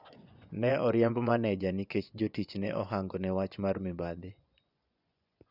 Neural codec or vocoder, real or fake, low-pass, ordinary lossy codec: vocoder, 24 kHz, 100 mel bands, Vocos; fake; 5.4 kHz; none